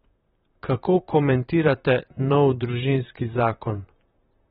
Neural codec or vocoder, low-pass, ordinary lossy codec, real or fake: vocoder, 44.1 kHz, 128 mel bands, Pupu-Vocoder; 19.8 kHz; AAC, 16 kbps; fake